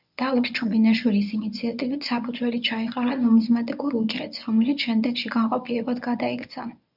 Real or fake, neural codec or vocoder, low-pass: fake; codec, 24 kHz, 0.9 kbps, WavTokenizer, medium speech release version 1; 5.4 kHz